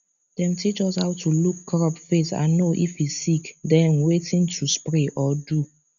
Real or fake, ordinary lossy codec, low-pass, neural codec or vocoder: real; none; 7.2 kHz; none